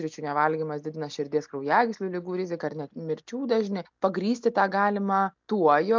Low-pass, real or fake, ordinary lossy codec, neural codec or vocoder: 7.2 kHz; real; MP3, 64 kbps; none